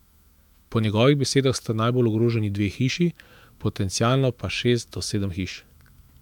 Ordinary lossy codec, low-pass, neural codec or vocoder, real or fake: MP3, 96 kbps; 19.8 kHz; autoencoder, 48 kHz, 128 numbers a frame, DAC-VAE, trained on Japanese speech; fake